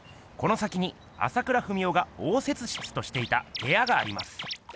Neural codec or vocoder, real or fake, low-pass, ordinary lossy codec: none; real; none; none